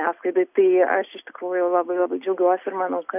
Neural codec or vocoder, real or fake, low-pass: none; real; 3.6 kHz